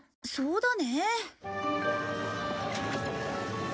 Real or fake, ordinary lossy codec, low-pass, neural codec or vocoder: real; none; none; none